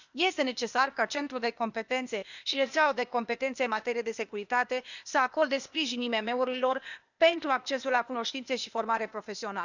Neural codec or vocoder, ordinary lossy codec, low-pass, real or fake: codec, 16 kHz, 0.8 kbps, ZipCodec; none; 7.2 kHz; fake